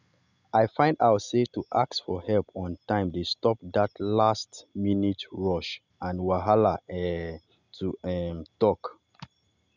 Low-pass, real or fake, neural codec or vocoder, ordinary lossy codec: 7.2 kHz; real; none; none